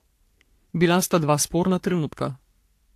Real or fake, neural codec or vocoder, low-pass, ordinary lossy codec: fake; codec, 44.1 kHz, 3.4 kbps, Pupu-Codec; 14.4 kHz; AAC, 64 kbps